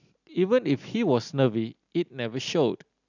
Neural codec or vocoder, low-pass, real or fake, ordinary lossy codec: none; 7.2 kHz; real; none